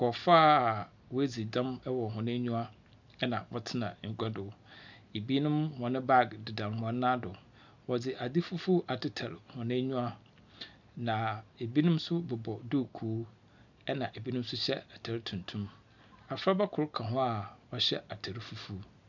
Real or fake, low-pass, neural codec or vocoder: real; 7.2 kHz; none